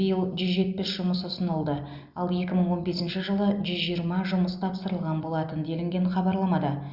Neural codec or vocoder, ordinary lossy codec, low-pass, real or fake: none; Opus, 64 kbps; 5.4 kHz; real